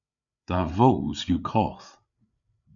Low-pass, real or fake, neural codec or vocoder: 7.2 kHz; fake; codec, 16 kHz, 8 kbps, FreqCodec, larger model